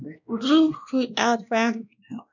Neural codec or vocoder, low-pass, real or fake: codec, 16 kHz, 1 kbps, X-Codec, WavLM features, trained on Multilingual LibriSpeech; 7.2 kHz; fake